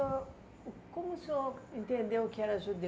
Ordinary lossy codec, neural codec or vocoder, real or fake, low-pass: none; none; real; none